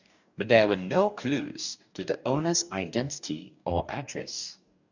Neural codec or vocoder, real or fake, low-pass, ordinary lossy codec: codec, 44.1 kHz, 2.6 kbps, DAC; fake; 7.2 kHz; none